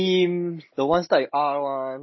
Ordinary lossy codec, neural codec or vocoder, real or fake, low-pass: MP3, 24 kbps; none; real; 7.2 kHz